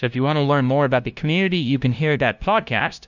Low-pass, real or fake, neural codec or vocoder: 7.2 kHz; fake; codec, 16 kHz, 0.5 kbps, FunCodec, trained on LibriTTS, 25 frames a second